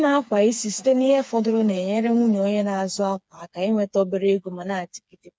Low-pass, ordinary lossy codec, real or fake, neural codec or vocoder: none; none; fake; codec, 16 kHz, 4 kbps, FreqCodec, smaller model